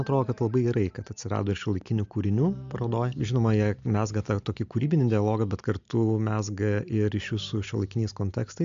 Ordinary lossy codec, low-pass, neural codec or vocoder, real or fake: MP3, 64 kbps; 7.2 kHz; none; real